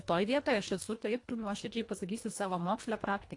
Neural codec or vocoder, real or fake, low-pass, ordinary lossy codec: codec, 24 kHz, 1.5 kbps, HILCodec; fake; 10.8 kHz; AAC, 48 kbps